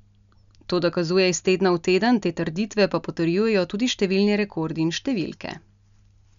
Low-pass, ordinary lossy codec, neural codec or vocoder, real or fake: 7.2 kHz; none; none; real